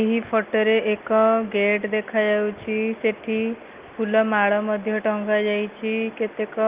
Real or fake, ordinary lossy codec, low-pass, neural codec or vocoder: real; Opus, 32 kbps; 3.6 kHz; none